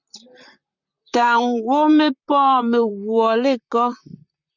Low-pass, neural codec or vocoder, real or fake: 7.2 kHz; vocoder, 44.1 kHz, 128 mel bands, Pupu-Vocoder; fake